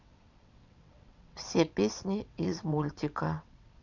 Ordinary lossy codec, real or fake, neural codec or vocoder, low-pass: none; fake; vocoder, 22.05 kHz, 80 mel bands, WaveNeXt; 7.2 kHz